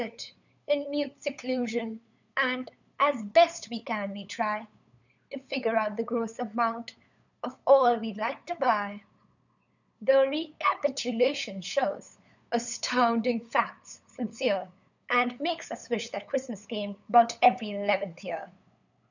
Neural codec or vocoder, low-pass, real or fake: codec, 16 kHz, 8 kbps, FunCodec, trained on LibriTTS, 25 frames a second; 7.2 kHz; fake